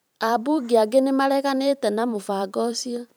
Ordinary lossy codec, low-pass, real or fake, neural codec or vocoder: none; none; fake; vocoder, 44.1 kHz, 128 mel bands every 512 samples, BigVGAN v2